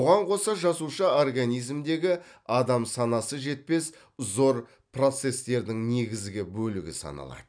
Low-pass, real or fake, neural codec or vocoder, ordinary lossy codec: 9.9 kHz; fake; vocoder, 44.1 kHz, 128 mel bands every 256 samples, BigVGAN v2; none